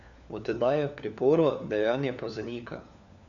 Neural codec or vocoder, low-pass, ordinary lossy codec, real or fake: codec, 16 kHz, 4 kbps, FunCodec, trained on LibriTTS, 50 frames a second; 7.2 kHz; none; fake